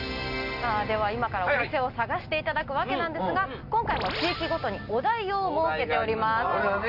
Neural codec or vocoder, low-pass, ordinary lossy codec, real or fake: none; 5.4 kHz; none; real